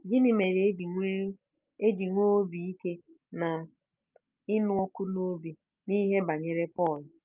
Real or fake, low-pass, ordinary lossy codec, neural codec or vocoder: real; 3.6 kHz; Opus, 24 kbps; none